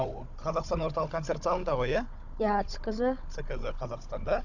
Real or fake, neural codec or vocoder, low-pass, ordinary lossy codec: fake; codec, 16 kHz, 16 kbps, FunCodec, trained on Chinese and English, 50 frames a second; 7.2 kHz; none